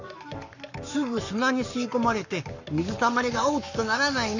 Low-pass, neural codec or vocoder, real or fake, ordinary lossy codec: 7.2 kHz; codec, 44.1 kHz, 7.8 kbps, Pupu-Codec; fake; none